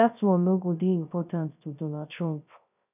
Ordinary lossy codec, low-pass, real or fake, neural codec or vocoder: none; 3.6 kHz; fake; codec, 16 kHz, 0.3 kbps, FocalCodec